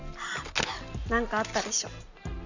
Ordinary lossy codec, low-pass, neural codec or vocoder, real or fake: none; 7.2 kHz; none; real